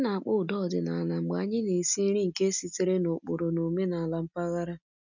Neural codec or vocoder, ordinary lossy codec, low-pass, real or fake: none; none; 7.2 kHz; real